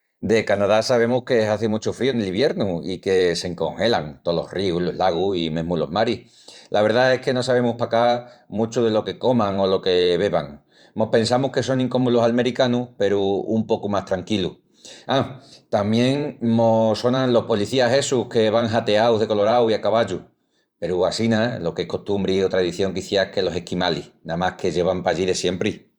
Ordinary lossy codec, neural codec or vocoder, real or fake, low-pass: Opus, 64 kbps; vocoder, 44.1 kHz, 128 mel bands every 512 samples, BigVGAN v2; fake; 19.8 kHz